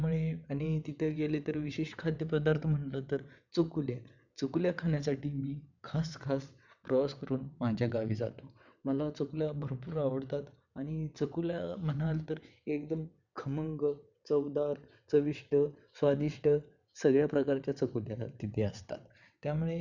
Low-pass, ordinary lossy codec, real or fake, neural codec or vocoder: 7.2 kHz; none; fake; vocoder, 22.05 kHz, 80 mel bands, WaveNeXt